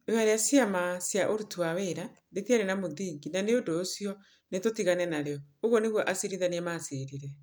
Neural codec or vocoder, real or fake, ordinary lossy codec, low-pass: none; real; none; none